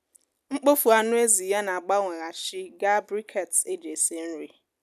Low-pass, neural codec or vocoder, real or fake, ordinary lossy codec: 14.4 kHz; none; real; none